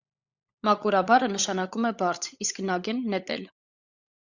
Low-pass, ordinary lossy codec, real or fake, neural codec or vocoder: 7.2 kHz; Opus, 64 kbps; fake; codec, 16 kHz, 16 kbps, FunCodec, trained on LibriTTS, 50 frames a second